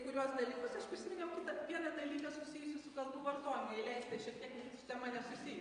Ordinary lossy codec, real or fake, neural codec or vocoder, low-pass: MP3, 64 kbps; fake; vocoder, 22.05 kHz, 80 mel bands, WaveNeXt; 9.9 kHz